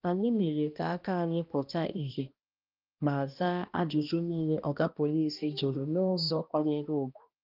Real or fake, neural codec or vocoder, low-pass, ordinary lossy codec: fake; codec, 16 kHz, 1 kbps, X-Codec, HuBERT features, trained on balanced general audio; 5.4 kHz; Opus, 24 kbps